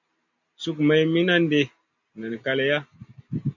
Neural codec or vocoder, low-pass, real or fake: none; 7.2 kHz; real